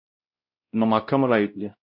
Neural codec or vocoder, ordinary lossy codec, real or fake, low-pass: codec, 16 kHz, 0.5 kbps, X-Codec, WavLM features, trained on Multilingual LibriSpeech; MP3, 48 kbps; fake; 5.4 kHz